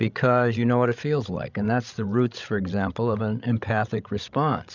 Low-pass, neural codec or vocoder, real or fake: 7.2 kHz; codec, 16 kHz, 16 kbps, FreqCodec, larger model; fake